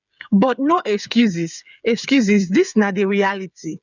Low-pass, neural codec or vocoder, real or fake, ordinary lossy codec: 7.2 kHz; codec, 16 kHz, 8 kbps, FreqCodec, smaller model; fake; none